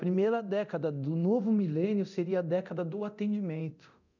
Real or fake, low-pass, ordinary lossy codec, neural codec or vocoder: fake; 7.2 kHz; none; codec, 24 kHz, 0.9 kbps, DualCodec